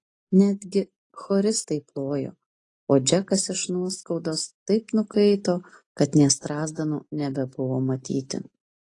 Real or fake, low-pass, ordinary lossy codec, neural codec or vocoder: real; 10.8 kHz; AAC, 32 kbps; none